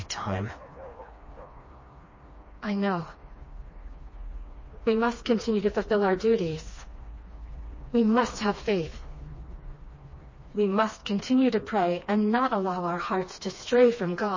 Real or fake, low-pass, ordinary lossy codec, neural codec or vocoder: fake; 7.2 kHz; MP3, 32 kbps; codec, 16 kHz, 2 kbps, FreqCodec, smaller model